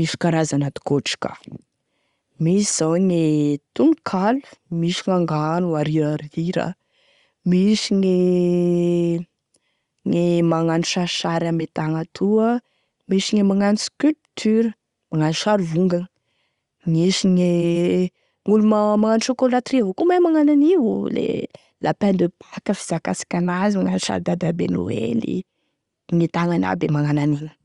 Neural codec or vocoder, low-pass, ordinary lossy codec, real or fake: none; 10.8 kHz; Opus, 64 kbps; real